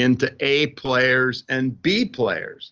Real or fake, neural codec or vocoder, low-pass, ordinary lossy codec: real; none; 7.2 kHz; Opus, 24 kbps